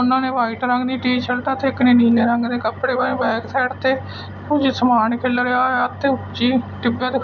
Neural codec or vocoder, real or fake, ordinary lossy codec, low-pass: none; real; none; 7.2 kHz